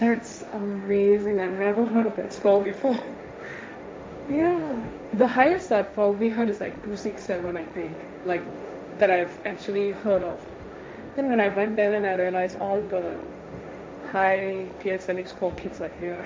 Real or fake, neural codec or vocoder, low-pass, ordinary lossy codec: fake; codec, 16 kHz, 1.1 kbps, Voila-Tokenizer; none; none